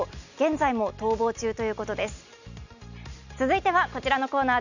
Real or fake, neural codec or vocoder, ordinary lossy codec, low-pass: real; none; none; 7.2 kHz